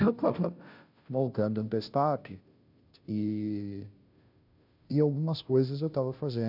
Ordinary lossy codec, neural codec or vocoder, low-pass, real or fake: none; codec, 16 kHz, 0.5 kbps, FunCodec, trained on Chinese and English, 25 frames a second; 5.4 kHz; fake